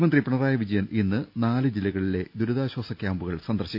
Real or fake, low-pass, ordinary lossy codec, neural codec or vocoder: real; 5.4 kHz; none; none